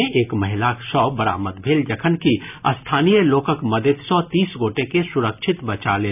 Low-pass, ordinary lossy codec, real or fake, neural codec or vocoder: 3.6 kHz; none; real; none